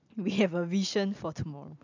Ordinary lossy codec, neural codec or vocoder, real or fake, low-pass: none; none; real; 7.2 kHz